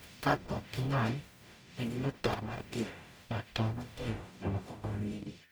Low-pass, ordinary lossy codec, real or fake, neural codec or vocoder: none; none; fake; codec, 44.1 kHz, 0.9 kbps, DAC